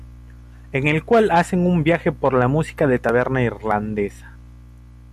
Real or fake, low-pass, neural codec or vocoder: real; 14.4 kHz; none